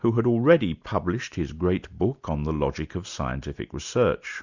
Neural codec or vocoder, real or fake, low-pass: none; real; 7.2 kHz